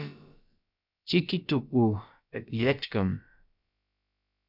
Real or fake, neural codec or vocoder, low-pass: fake; codec, 16 kHz, about 1 kbps, DyCAST, with the encoder's durations; 5.4 kHz